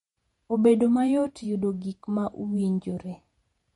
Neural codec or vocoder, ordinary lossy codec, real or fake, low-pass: vocoder, 48 kHz, 128 mel bands, Vocos; MP3, 48 kbps; fake; 19.8 kHz